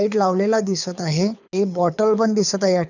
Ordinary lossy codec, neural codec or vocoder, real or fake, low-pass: none; codec, 24 kHz, 6 kbps, HILCodec; fake; 7.2 kHz